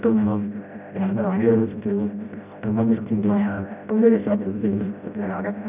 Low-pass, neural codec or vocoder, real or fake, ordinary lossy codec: 3.6 kHz; codec, 16 kHz, 0.5 kbps, FreqCodec, smaller model; fake; none